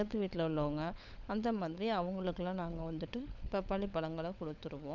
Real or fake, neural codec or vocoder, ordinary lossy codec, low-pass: fake; codec, 16 kHz, 16 kbps, FunCodec, trained on LibriTTS, 50 frames a second; none; 7.2 kHz